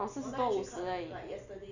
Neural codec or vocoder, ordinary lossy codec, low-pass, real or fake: none; none; 7.2 kHz; real